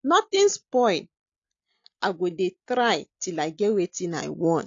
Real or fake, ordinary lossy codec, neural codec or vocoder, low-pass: real; AAC, 48 kbps; none; 7.2 kHz